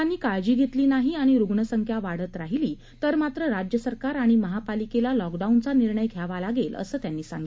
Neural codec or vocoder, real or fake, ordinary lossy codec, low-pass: none; real; none; none